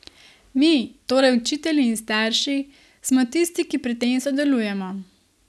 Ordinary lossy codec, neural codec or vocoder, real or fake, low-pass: none; none; real; none